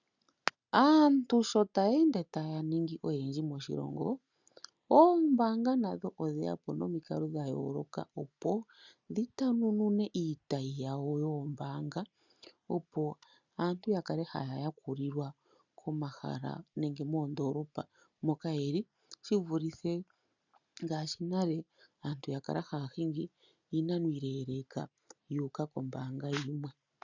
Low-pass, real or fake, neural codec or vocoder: 7.2 kHz; real; none